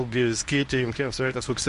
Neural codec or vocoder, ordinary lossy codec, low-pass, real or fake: codec, 24 kHz, 0.9 kbps, WavTokenizer, medium speech release version 1; MP3, 48 kbps; 10.8 kHz; fake